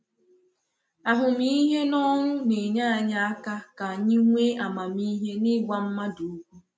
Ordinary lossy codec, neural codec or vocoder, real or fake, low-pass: none; none; real; none